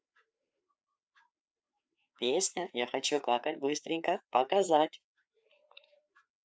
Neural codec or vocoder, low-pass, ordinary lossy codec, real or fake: codec, 16 kHz, 4 kbps, FreqCodec, larger model; none; none; fake